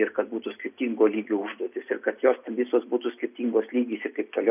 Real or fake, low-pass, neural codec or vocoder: real; 3.6 kHz; none